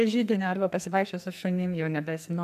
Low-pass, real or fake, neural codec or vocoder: 14.4 kHz; fake; codec, 44.1 kHz, 2.6 kbps, SNAC